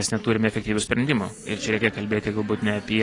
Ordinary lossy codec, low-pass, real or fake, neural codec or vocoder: AAC, 32 kbps; 10.8 kHz; real; none